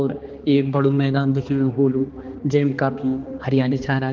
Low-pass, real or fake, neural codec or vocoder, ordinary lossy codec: 7.2 kHz; fake; codec, 16 kHz, 2 kbps, X-Codec, HuBERT features, trained on balanced general audio; Opus, 16 kbps